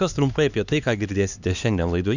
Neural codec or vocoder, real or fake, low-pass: codec, 16 kHz, 2 kbps, X-Codec, HuBERT features, trained on LibriSpeech; fake; 7.2 kHz